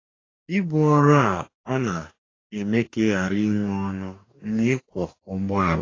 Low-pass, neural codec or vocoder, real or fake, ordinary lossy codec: 7.2 kHz; codec, 44.1 kHz, 2.6 kbps, DAC; fake; AAC, 32 kbps